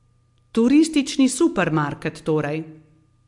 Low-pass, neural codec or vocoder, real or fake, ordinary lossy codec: 10.8 kHz; none; real; MP3, 64 kbps